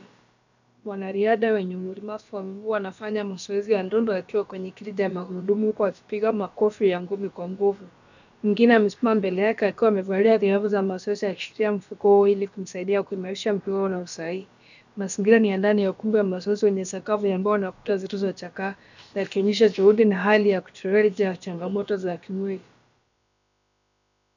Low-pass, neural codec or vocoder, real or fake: 7.2 kHz; codec, 16 kHz, about 1 kbps, DyCAST, with the encoder's durations; fake